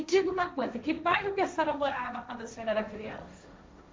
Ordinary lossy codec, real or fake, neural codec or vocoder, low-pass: none; fake; codec, 16 kHz, 1.1 kbps, Voila-Tokenizer; none